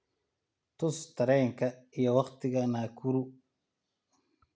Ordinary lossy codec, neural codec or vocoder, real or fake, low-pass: none; none; real; none